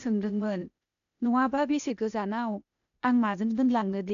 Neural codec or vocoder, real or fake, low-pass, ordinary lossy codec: codec, 16 kHz, 0.8 kbps, ZipCodec; fake; 7.2 kHz; none